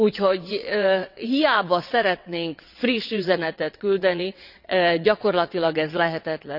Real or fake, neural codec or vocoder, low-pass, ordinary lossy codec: fake; vocoder, 22.05 kHz, 80 mel bands, WaveNeXt; 5.4 kHz; none